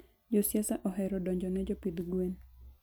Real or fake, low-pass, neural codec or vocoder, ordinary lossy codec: real; none; none; none